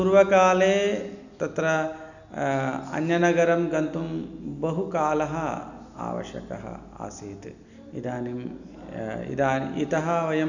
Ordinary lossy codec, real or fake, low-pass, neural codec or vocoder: none; real; 7.2 kHz; none